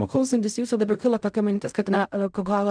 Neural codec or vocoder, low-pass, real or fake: codec, 16 kHz in and 24 kHz out, 0.4 kbps, LongCat-Audio-Codec, fine tuned four codebook decoder; 9.9 kHz; fake